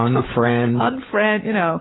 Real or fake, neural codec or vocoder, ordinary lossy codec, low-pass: fake; codec, 16 kHz, 16 kbps, FunCodec, trained on LibriTTS, 50 frames a second; AAC, 16 kbps; 7.2 kHz